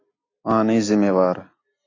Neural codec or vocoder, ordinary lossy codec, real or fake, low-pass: none; AAC, 32 kbps; real; 7.2 kHz